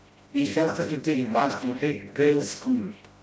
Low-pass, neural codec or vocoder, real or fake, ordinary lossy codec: none; codec, 16 kHz, 0.5 kbps, FreqCodec, smaller model; fake; none